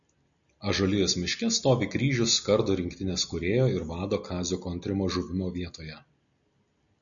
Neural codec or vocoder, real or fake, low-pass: none; real; 7.2 kHz